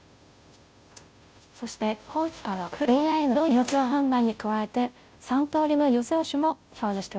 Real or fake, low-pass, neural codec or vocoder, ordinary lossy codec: fake; none; codec, 16 kHz, 0.5 kbps, FunCodec, trained on Chinese and English, 25 frames a second; none